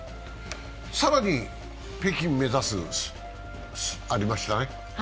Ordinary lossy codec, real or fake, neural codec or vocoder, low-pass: none; real; none; none